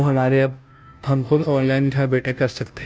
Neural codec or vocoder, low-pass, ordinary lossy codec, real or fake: codec, 16 kHz, 0.5 kbps, FunCodec, trained on Chinese and English, 25 frames a second; none; none; fake